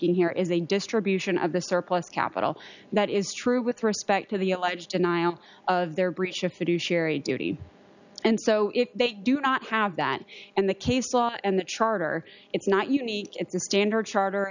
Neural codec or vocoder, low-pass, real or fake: none; 7.2 kHz; real